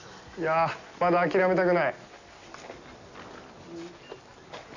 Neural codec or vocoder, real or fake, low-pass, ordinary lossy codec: none; real; 7.2 kHz; none